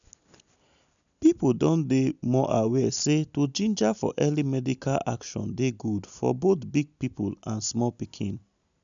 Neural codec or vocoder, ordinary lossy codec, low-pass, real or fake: none; none; 7.2 kHz; real